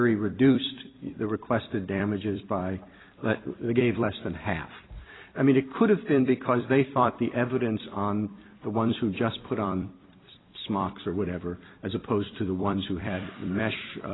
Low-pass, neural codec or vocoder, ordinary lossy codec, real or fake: 7.2 kHz; vocoder, 44.1 kHz, 128 mel bands every 256 samples, BigVGAN v2; AAC, 16 kbps; fake